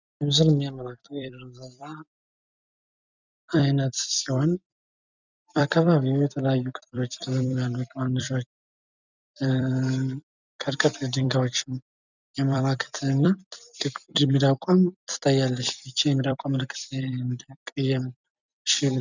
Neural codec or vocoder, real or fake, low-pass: vocoder, 44.1 kHz, 128 mel bands every 256 samples, BigVGAN v2; fake; 7.2 kHz